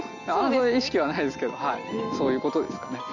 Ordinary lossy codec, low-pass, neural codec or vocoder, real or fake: none; 7.2 kHz; none; real